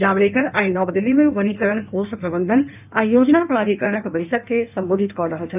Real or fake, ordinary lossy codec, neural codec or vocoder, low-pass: fake; none; codec, 16 kHz in and 24 kHz out, 1.1 kbps, FireRedTTS-2 codec; 3.6 kHz